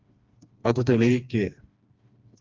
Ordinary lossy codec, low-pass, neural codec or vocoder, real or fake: Opus, 32 kbps; 7.2 kHz; codec, 16 kHz, 2 kbps, FreqCodec, smaller model; fake